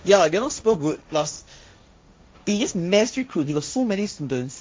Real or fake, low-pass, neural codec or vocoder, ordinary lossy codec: fake; none; codec, 16 kHz, 1.1 kbps, Voila-Tokenizer; none